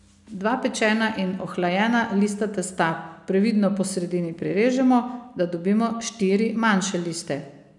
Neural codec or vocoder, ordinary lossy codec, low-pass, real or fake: none; none; 10.8 kHz; real